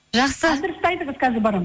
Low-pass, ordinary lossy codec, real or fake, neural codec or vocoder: none; none; real; none